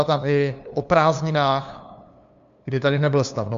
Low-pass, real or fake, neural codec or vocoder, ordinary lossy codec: 7.2 kHz; fake; codec, 16 kHz, 2 kbps, FunCodec, trained on LibriTTS, 25 frames a second; MP3, 64 kbps